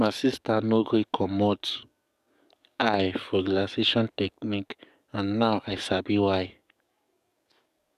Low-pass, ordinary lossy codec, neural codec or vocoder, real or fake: 14.4 kHz; none; codec, 44.1 kHz, 7.8 kbps, DAC; fake